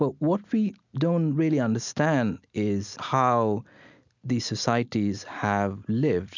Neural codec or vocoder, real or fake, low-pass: none; real; 7.2 kHz